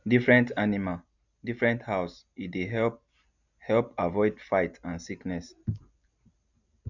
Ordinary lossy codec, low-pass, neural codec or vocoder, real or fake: none; 7.2 kHz; none; real